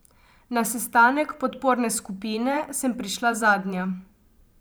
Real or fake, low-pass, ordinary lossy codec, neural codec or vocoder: fake; none; none; vocoder, 44.1 kHz, 128 mel bands every 512 samples, BigVGAN v2